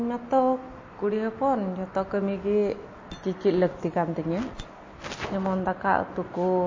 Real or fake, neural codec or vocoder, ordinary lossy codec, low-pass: real; none; MP3, 32 kbps; 7.2 kHz